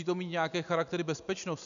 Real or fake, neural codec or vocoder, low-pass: real; none; 7.2 kHz